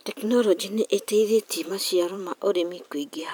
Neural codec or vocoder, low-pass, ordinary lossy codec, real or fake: vocoder, 44.1 kHz, 128 mel bands every 512 samples, BigVGAN v2; none; none; fake